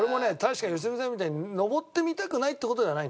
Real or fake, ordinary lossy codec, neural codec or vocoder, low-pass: real; none; none; none